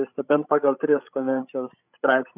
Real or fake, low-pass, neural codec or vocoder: fake; 3.6 kHz; codec, 16 kHz, 16 kbps, FreqCodec, larger model